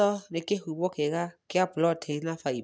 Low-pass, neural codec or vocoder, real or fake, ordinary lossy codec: none; none; real; none